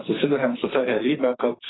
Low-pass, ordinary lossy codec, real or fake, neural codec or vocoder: 7.2 kHz; AAC, 16 kbps; fake; codec, 44.1 kHz, 2.6 kbps, SNAC